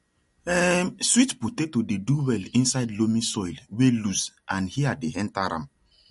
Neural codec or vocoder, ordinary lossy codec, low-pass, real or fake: none; MP3, 48 kbps; 14.4 kHz; real